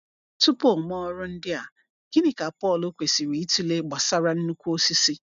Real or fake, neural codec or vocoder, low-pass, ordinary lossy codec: real; none; 7.2 kHz; none